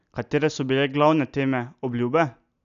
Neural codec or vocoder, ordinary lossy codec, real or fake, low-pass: none; none; real; 7.2 kHz